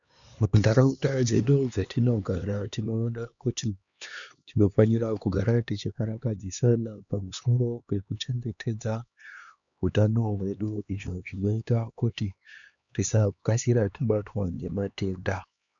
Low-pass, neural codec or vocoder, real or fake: 7.2 kHz; codec, 16 kHz, 2 kbps, X-Codec, HuBERT features, trained on LibriSpeech; fake